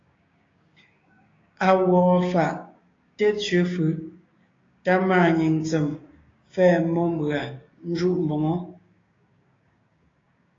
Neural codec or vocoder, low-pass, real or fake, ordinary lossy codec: codec, 16 kHz, 6 kbps, DAC; 7.2 kHz; fake; AAC, 32 kbps